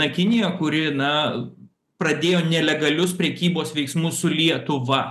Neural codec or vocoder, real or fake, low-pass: none; real; 14.4 kHz